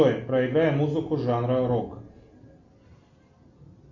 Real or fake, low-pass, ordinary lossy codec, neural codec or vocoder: real; 7.2 kHz; MP3, 48 kbps; none